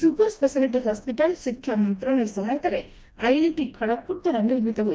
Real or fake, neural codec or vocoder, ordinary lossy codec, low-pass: fake; codec, 16 kHz, 1 kbps, FreqCodec, smaller model; none; none